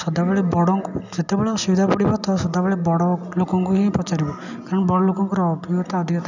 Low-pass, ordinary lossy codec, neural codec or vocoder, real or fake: 7.2 kHz; none; none; real